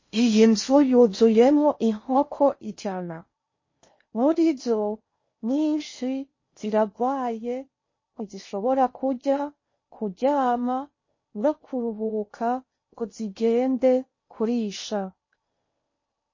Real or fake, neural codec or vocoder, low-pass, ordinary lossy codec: fake; codec, 16 kHz in and 24 kHz out, 0.6 kbps, FocalCodec, streaming, 4096 codes; 7.2 kHz; MP3, 32 kbps